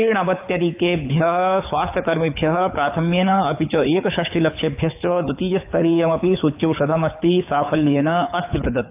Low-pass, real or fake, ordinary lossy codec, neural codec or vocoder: 3.6 kHz; fake; AAC, 32 kbps; codec, 24 kHz, 6 kbps, HILCodec